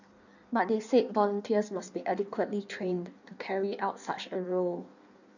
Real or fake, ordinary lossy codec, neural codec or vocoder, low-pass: fake; none; codec, 16 kHz in and 24 kHz out, 1.1 kbps, FireRedTTS-2 codec; 7.2 kHz